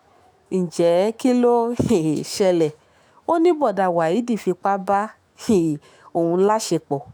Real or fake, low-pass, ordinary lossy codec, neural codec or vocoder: fake; none; none; autoencoder, 48 kHz, 128 numbers a frame, DAC-VAE, trained on Japanese speech